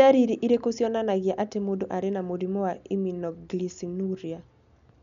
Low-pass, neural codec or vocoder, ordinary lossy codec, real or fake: 7.2 kHz; none; none; real